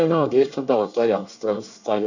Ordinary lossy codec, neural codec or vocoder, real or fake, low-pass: none; codec, 24 kHz, 1 kbps, SNAC; fake; 7.2 kHz